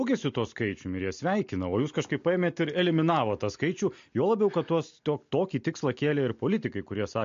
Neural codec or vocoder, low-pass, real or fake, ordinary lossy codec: none; 7.2 kHz; real; MP3, 48 kbps